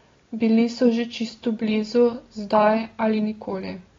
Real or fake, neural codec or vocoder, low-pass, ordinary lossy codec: real; none; 7.2 kHz; AAC, 32 kbps